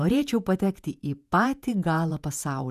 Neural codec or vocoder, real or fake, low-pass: vocoder, 48 kHz, 128 mel bands, Vocos; fake; 14.4 kHz